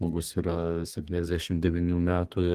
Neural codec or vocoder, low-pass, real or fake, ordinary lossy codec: codec, 32 kHz, 1.9 kbps, SNAC; 14.4 kHz; fake; Opus, 24 kbps